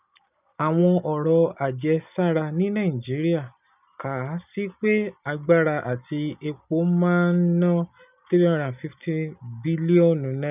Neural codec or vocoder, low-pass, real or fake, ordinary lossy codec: none; 3.6 kHz; real; none